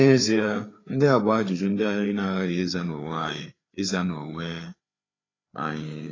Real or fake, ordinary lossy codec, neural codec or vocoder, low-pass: fake; AAC, 32 kbps; codec, 16 kHz, 4 kbps, FreqCodec, larger model; 7.2 kHz